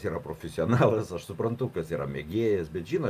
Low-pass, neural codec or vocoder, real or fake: 14.4 kHz; none; real